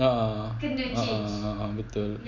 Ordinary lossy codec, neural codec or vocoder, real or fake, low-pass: none; none; real; 7.2 kHz